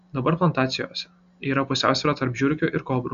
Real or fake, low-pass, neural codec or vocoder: real; 7.2 kHz; none